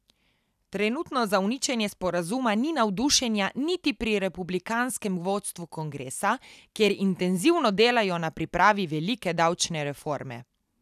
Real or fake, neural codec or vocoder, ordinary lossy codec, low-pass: real; none; none; 14.4 kHz